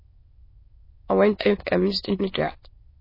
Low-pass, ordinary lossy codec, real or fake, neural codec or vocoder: 5.4 kHz; MP3, 24 kbps; fake; autoencoder, 22.05 kHz, a latent of 192 numbers a frame, VITS, trained on many speakers